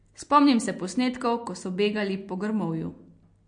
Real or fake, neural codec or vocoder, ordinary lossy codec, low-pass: real; none; MP3, 48 kbps; 9.9 kHz